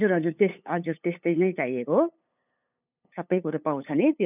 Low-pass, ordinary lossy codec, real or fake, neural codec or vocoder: 3.6 kHz; none; fake; codec, 16 kHz, 8 kbps, FunCodec, trained on LibriTTS, 25 frames a second